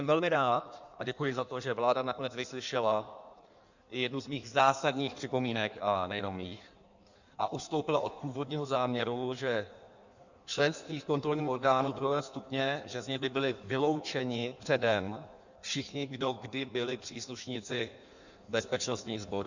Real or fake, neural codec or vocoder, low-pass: fake; codec, 16 kHz in and 24 kHz out, 1.1 kbps, FireRedTTS-2 codec; 7.2 kHz